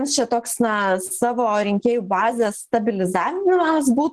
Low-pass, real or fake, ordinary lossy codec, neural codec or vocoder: 10.8 kHz; real; Opus, 16 kbps; none